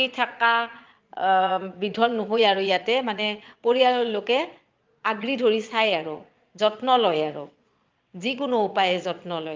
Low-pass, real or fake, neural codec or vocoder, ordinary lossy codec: 7.2 kHz; fake; vocoder, 22.05 kHz, 80 mel bands, Vocos; Opus, 24 kbps